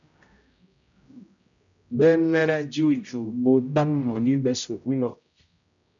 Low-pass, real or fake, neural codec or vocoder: 7.2 kHz; fake; codec, 16 kHz, 0.5 kbps, X-Codec, HuBERT features, trained on general audio